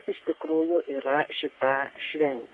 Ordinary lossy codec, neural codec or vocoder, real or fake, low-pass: Opus, 64 kbps; codec, 44.1 kHz, 3.4 kbps, Pupu-Codec; fake; 10.8 kHz